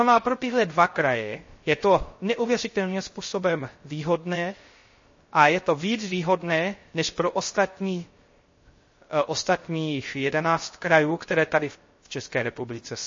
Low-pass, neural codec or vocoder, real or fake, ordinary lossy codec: 7.2 kHz; codec, 16 kHz, 0.3 kbps, FocalCodec; fake; MP3, 32 kbps